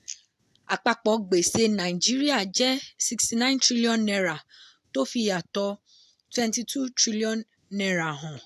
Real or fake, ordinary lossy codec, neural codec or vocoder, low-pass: real; AAC, 96 kbps; none; 14.4 kHz